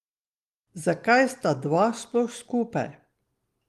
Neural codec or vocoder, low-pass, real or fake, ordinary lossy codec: none; 14.4 kHz; real; Opus, 24 kbps